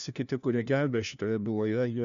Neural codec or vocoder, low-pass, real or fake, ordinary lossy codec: codec, 16 kHz, 1 kbps, FunCodec, trained on LibriTTS, 50 frames a second; 7.2 kHz; fake; MP3, 96 kbps